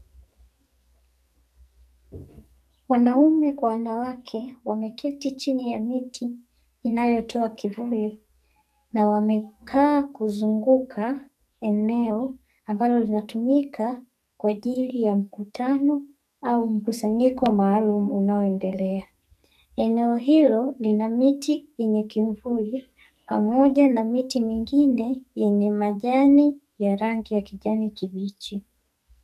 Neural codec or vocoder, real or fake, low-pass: codec, 44.1 kHz, 2.6 kbps, SNAC; fake; 14.4 kHz